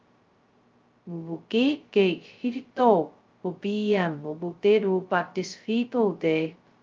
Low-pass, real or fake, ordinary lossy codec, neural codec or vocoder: 7.2 kHz; fake; Opus, 24 kbps; codec, 16 kHz, 0.2 kbps, FocalCodec